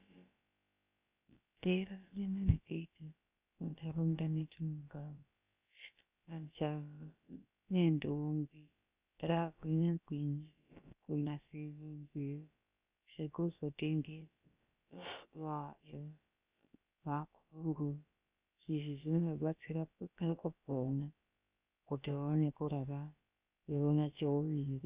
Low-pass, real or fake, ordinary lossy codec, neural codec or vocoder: 3.6 kHz; fake; Opus, 64 kbps; codec, 16 kHz, about 1 kbps, DyCAST, with the encoder's durations